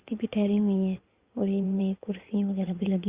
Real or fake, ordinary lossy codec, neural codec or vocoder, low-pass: fake; Opus, 64 kbps; codec, 16 kHz in and 24 kHz out, 2.2 kbps, FireRedTTS-2 codec; 3.6 kHz